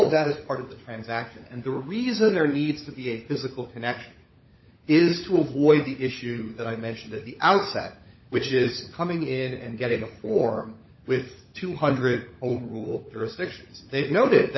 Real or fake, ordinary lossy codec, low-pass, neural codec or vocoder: fake; MP3, 24 kbps; 7.2 kHz; codec, 16 kHz, 16 kbps, FunCodec, trained on LibriTTS, 50 frames a second